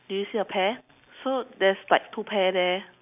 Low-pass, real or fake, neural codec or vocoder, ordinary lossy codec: 3.6 kHz; real; none; none